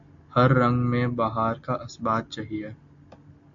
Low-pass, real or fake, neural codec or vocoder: 7.2 kHz; real; none